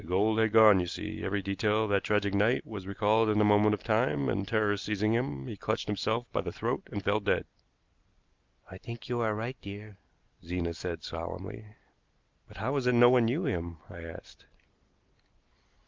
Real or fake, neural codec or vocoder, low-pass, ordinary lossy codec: real; none; 7.2 kHz; Opus, 32 kbps